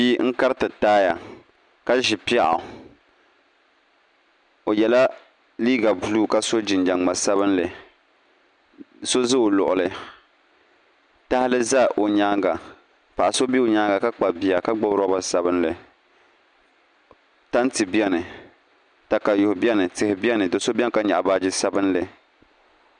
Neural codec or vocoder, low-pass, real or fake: none; 9.9 kHz; real